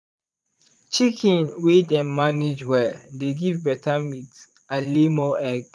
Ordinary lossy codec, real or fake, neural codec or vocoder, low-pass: none; fake; vocoder, 22.05 kHz, 80 mel bands, Vocos; none